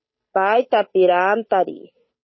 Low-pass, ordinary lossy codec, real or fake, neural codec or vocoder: 7.2 kHz; MP3, 24 kbps; fake; codec, 16 kHz, 8 kbps, FunCodec, trained on Chinese and English, 25 frames a second